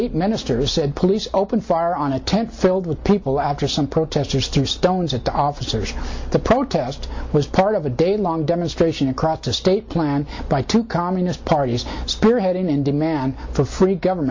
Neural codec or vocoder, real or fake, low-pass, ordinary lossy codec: none; real; 7.2 kHz; MP3, 48 kbps